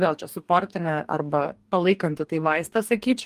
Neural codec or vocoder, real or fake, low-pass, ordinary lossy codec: codec, 44.1 kHz, 2.6 kbps, DAC; fake; 14.4 kHz; Opus, 32 kbps